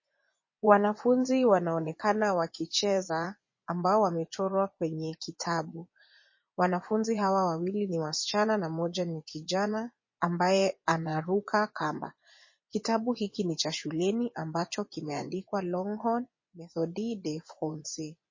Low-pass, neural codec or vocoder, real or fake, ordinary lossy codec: 7.2 kHz; vocoder, 24 kHz, 100 mel bands, Vocos; fake; MP3, 32 kbps